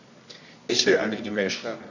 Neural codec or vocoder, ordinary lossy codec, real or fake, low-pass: codec, 24 kHz, 0.9 kbps, WavTokenizer, medium music audio release; none; fake; 7.2 kHz